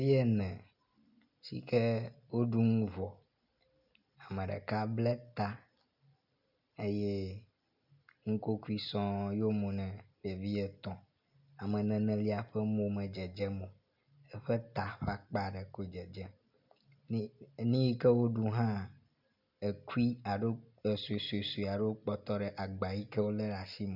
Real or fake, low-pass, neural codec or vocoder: real; 5.4 kHz; none